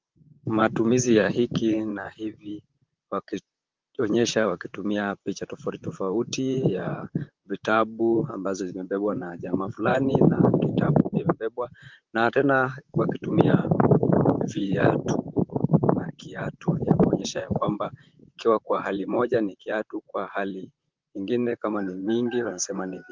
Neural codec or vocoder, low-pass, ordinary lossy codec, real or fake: vocoder, 44.1 kHz, 128 mel bands, Pupu-Vocoder; 7.2 kHz; Opus, 24 kbps; fake